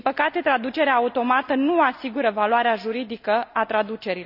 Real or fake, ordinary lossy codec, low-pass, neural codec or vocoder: real; none; 5.4 kHz; none